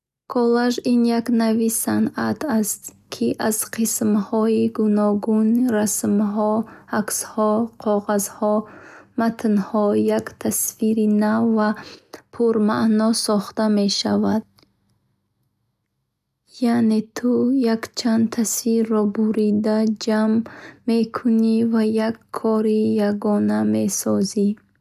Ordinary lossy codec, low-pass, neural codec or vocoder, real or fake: none; 14.4 kHz; none; real